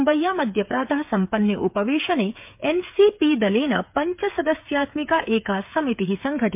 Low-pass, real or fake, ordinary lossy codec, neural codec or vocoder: 3.6 kHz; fake; MP3, 32 kbps; codec, 16 kHz, 8 kbps, FreqCodec, smaller model